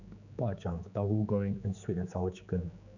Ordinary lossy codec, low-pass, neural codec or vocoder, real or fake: none; 7.2 kHz; codec, 16 kHz, 4 kbps, X-Codec, HuBERT features, trained on general audio; fake